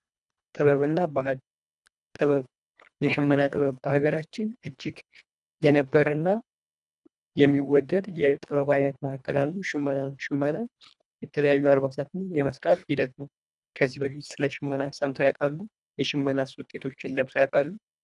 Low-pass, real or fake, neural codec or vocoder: 10.8 kHz; fake; codec, 24 kHz, 1.5 kbps, HILCodec